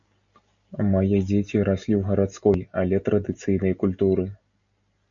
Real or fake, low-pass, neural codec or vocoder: real; 7.2 kHz; none